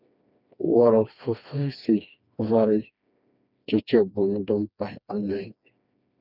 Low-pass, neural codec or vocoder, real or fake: 5.4 kHz; codec, 16 kHz, 2 kbps, FreqCodec, smaller model; fake